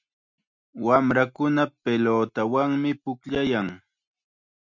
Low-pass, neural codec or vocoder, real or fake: 7.2 kHz; none; real